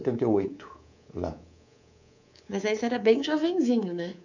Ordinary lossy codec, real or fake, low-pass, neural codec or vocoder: none; fake; 7.2 kHz; codec, 24 kHz, 3.1 kbps, DualCodec